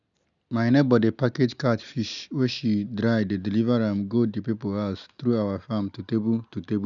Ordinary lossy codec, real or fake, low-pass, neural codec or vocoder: none; real; 7.2 kHz; none